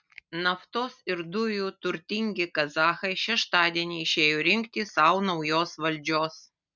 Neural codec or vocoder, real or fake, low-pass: none; real; 7.2 kHz